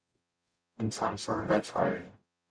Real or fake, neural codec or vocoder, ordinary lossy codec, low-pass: fake; codec, 44.1 kHz, 0.9 kbps, DAC; MP3, 96 kbps; 9.9 kHz